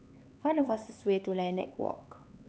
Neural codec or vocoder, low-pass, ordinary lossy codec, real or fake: codec, 16 kHz, 4 kbps, X-Codec, HuBERT features, trained on LibriSpeech; none; none; fake